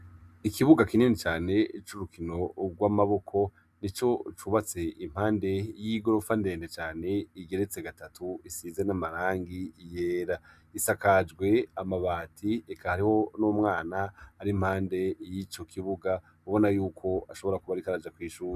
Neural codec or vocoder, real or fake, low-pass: none; real; 14.4 kHz